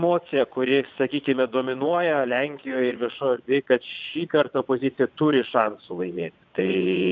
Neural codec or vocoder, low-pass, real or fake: vocoder, 22.05 kHz, 80 mel bands, WaveNeXt; 7.2 kHz; fake